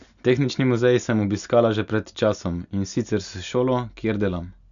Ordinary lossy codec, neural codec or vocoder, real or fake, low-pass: none; none; real; 7.2 kHz